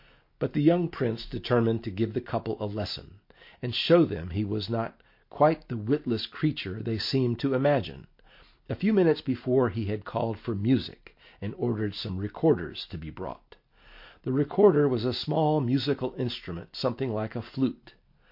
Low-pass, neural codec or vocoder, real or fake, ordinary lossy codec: 5.4 kHz; none; real; MP3, 32 kbps